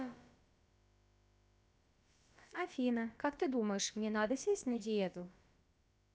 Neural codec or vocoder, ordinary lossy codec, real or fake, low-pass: codec, 16 kHz, about 1 kbps, DyCAST, with the encoder's durations; none; fake; none